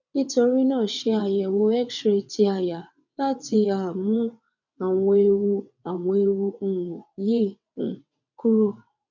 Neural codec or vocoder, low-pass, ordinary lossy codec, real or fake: vocoder, 22.05 kHz, 80 mel bands, WaveNeXt; 7.2 kHz; none; fake